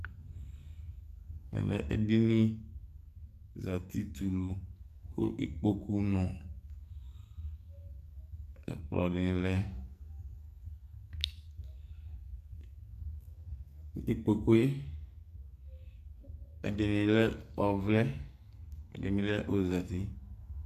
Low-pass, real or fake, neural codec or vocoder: 14.4 kHz; fake; codec, 44.1 kHz, 2.6 kbps, SNAC